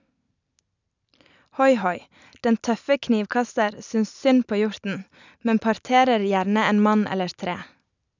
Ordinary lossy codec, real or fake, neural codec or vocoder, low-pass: none; real; none; 7.2 kHz